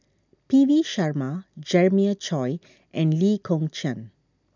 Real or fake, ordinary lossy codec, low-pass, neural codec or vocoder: real; none; 7.2 kHz; none